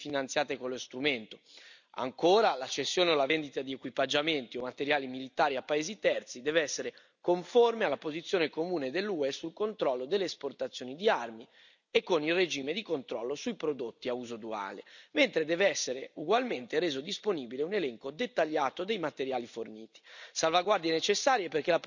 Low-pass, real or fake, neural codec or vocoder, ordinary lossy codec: 7.2 kHz; real; none; none